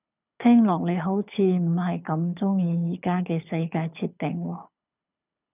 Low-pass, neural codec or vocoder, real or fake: 3.6 kHz; codec, 24 kHz, 6 kbps, HILCodec; fake